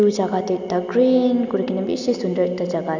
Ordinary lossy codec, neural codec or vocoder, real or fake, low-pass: none; none; real; 7.2 kHz